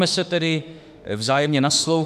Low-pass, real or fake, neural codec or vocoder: 14.4 kHz; fake; autoencoder, 48 kHz, 32 numbers a frame, DAC-VAE, trained on Japanese speech